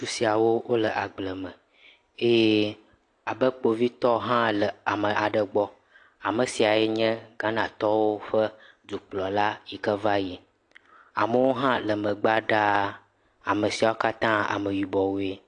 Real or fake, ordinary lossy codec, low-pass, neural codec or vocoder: real; AAC, 48 kbps; 9.9 kHz; none